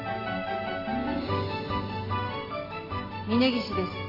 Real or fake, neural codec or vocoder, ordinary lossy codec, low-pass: real; none; AAC, 32 kbps; 5.4 kHz